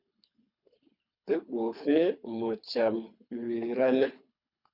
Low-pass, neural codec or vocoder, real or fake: 5.4 kHz; codec, 24 kHz, 3 kbps, HILCodec; fake